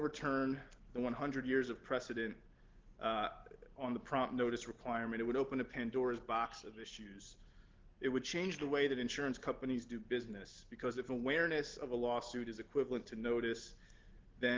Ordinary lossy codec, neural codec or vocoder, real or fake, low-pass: Opus, 16 kbps; none; real; 7.2 kHz